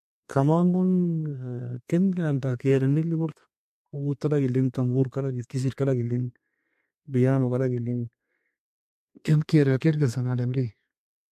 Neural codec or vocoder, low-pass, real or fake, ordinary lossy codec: codec, 32 kHz, 1.9 kbps, SNAC; 14.4 kHz; fake; MP3, 64 kbps